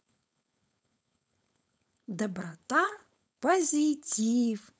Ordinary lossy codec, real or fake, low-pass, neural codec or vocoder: none; fake; none; codec, 16 kHz, 4.8 kbps, FACodec